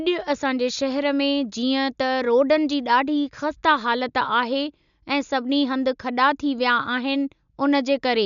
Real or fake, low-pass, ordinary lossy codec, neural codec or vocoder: real; 7.2 kHz; none; none